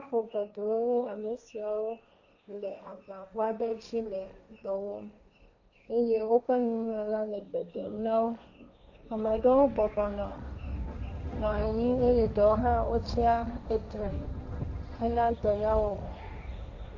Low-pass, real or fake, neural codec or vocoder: 7.2 kHz; fake; codec, 16 kHz, 1.1 kbps, Voila-Tokenizer